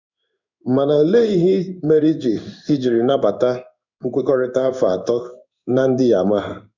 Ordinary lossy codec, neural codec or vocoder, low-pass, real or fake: none; codec, 16 kHz in and 24 kHz out, 1 kbps, XY-Tokenizer; 7.2 kHz; fake